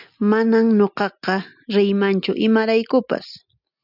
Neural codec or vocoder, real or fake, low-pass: none; real; 5.4 kHz